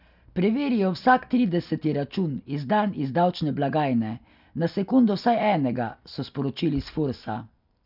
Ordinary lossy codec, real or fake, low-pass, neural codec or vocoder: none; real; 5.4 kHz; none